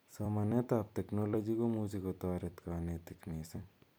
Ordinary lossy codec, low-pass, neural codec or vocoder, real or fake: none; none; vocoder, 44.1 kHz, 128 mel bands every 256 samples, BigVGAN v2; fake